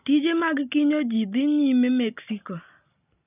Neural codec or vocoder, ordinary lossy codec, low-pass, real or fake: none; none; 3.6 kHz; real